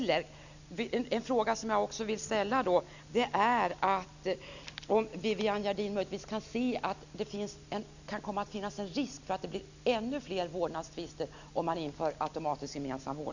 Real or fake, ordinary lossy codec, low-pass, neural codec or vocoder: real; none; 7.2 kHz; none